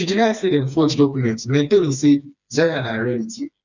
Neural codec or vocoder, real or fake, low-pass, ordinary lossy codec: codec, 16 kHz, 2 kbps, FreqCodec, smaller model; fake; 7.2 kHz; none